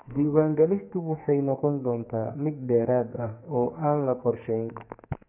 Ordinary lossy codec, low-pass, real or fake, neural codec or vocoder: none; 3.6 kHz; fake; codec, 44.1 kHz, 2.6 kbps, SNAC